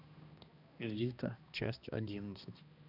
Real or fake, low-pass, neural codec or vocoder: fake; 5.4 kHz; codec, 16 kHz, 1 kbps, X-Codec, HuBERT features, trained on balanced general audio